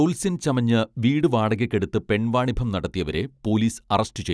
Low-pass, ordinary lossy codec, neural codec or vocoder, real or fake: none; none; none; real